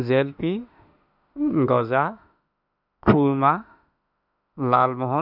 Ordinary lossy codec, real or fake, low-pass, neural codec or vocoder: none; fake; 5.4 kHz; autoencoder, 48 kHz, 32 numbers a frame, DAC-VAE, trained on Japanese speech